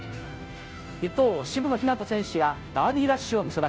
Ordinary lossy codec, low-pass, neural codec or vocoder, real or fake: none; none; codec, 16 kHz, 0.5 kbps, FunCodec, trained on Chinese and English, 25 frames a second; fake